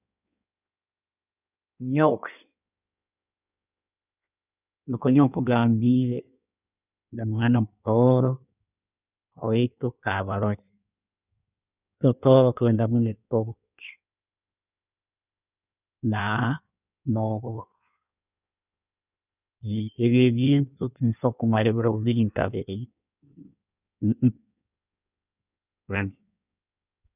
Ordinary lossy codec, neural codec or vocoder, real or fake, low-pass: none; codec, 16 kHz in and 24 kHz out, 1.1 kbps, FireRedTTS-2 codec; fake; 3.6 kHz